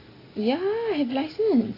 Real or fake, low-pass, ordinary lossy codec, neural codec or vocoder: real; 5.4 kHz; AAC, 24 kbps; none